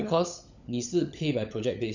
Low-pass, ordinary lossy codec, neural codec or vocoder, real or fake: 7.2 kHz; none; codec, 16 kHz, 16 kbps, FunCodec, trained on LibriTTS, 50 frames a second; fake